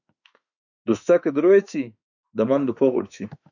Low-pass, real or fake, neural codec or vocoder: 7.2 kHz; fake; autoencoder, 48 kHz, 32 numbers a frame, DAC-VAE, trained on Japanese speech